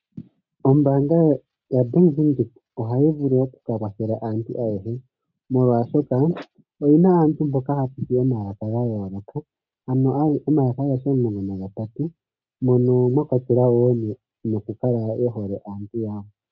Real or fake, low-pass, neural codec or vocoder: real; 7.2 kHz; none